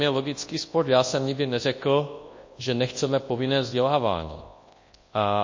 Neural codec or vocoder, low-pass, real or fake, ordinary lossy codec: codec, 24 kHz, 0.9 kbps, WavTokenizer, large speech release; 7.2 kHz; fake; MP3, 32 kbps